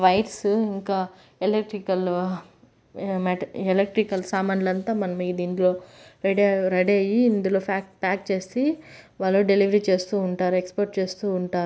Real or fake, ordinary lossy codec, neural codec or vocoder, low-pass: real; none; none; none